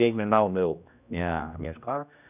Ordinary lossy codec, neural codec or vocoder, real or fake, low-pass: none; codec, 16 kHz, 1 kbps, X-Codec, HuBERT features, trained on general audio; fake; 3.6 kHz